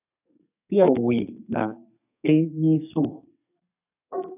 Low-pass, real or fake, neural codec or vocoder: 3.6 kHz; fake; codec, 32 kHz, 1.9 kbps, SNAC